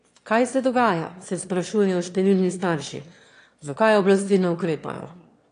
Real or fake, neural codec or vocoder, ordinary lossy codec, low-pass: fake; autoencoder, 22.05 kHz, a latent of 192 numbers a frame, VITS, trained on one speaker; AAC, 48 kbps; 9.9 kHz